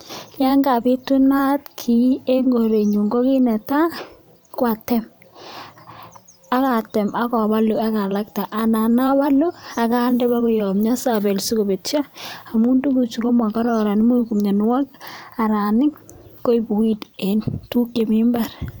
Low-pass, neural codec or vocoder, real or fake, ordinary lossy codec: none; vocoder, 44.1 kHz, 128 mel bands every 512 samples, BigVGAN v2; fake; none